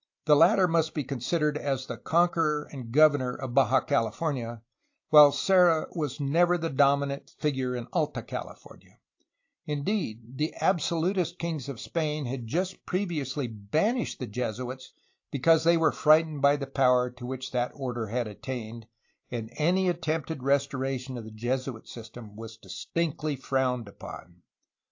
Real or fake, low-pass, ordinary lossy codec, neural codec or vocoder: real; 7.2 kHz; AAC, 48 kbps; none